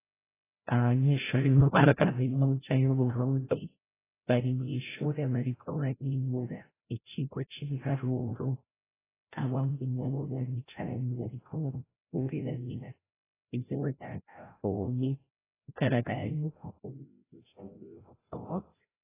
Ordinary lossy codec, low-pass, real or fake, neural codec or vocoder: AAC, 16 kbps; 3.6 kHz; fake; codec, 16 kHz, 0.5 kbps, FreqCodec, larger model